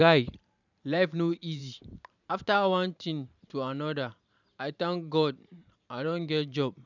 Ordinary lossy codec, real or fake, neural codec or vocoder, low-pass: none; fake; vocoder, 44.1 kHz, 128 mel bands every 512 samples, BigVGAN v2; 7.2 kHz